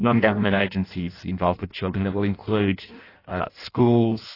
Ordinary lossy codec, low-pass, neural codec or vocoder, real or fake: AAC, 24 kbps; 5.4 kHz; codec, 16 kHz in and 24 kHz out, 0.6 kbps, FireRedTTS-2 codec; fake